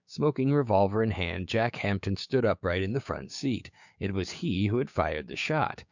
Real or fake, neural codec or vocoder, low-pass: fake; codec, 16 kHz, 6 kbps, DAC; 7.2 kHz